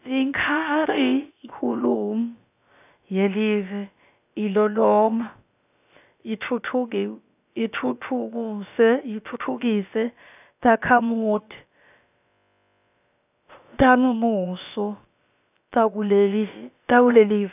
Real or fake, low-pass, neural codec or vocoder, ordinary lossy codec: fake; 3.6 kHz; codec, 16 kHz, about 1 kbps, DyCAST, with the encoder's durations; none